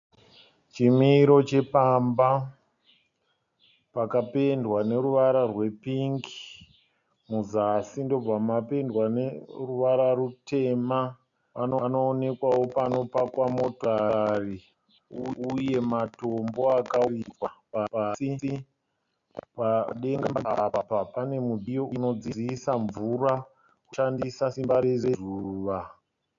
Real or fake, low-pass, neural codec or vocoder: real; 7.2 kHz; none